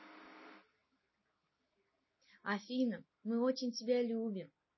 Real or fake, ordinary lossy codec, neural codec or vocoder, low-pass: real; MP3, 24 kbps; none; 7.2 kHz